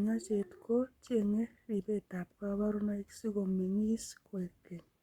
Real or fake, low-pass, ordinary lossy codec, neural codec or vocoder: fake; 19.8 kHz; Opus, 64 kbps; codec, 44.1 kHz, 7.8 kbps, Pupu-Codec